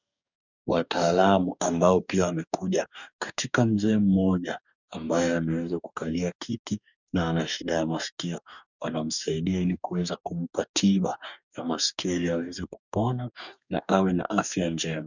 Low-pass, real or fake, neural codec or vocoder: 7.2 kHz; fake; codec, 44.1 kHz, 2.6 kbps, DAC